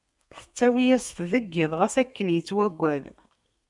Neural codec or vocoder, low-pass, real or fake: codec, 32 kHz, 1.9 kbps, SNAC; 10.8 kHz; fake